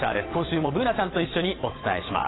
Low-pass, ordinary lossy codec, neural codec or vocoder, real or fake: 7.2 kHz; AAC, 16 kbps; vocoder, 22.05 kHz, 80 mel bands, WaveNeXt; fake